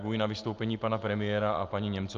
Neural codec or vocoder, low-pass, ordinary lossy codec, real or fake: none; 7.2 kHz; Opus, 24 kbps; real